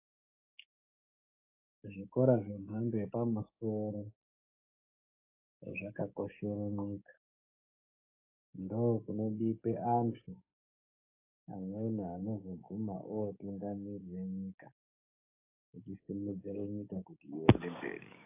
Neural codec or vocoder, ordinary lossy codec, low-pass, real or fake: codec, 16 kHz, 6 kbps, DAC; AAC, 24 kbps; 3.6 kHz; fake